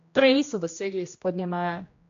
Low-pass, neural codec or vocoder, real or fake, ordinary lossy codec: 7.2 kHz; codec, 16 kHz, 1 kbps, X-Codec, HuBERT features, trained on general audio; fake; AAC, 48 kbps